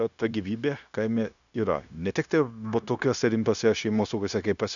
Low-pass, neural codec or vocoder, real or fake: 7.2 kHz; codec, 16 kHz, 0.9 kbps, LongCat-Audio-Codec; fake